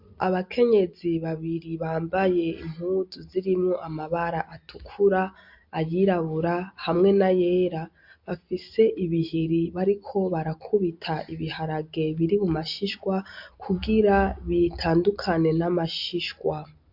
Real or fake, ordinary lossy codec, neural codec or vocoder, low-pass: real; MP3, 48 kbps; none; 5.4 kHz